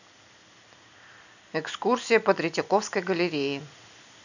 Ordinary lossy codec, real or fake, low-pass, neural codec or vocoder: none; real; 7.2 kHz; none